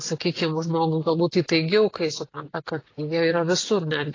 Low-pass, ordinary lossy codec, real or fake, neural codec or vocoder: 7.2 kHz; AAC, 32 kbps; real; none